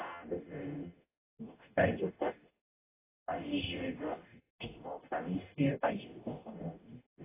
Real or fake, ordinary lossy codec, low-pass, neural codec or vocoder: fake; AAC, 16 kbps; 3.6 kHz; codec, 44.1 kHz, 0.9 kbps, DAC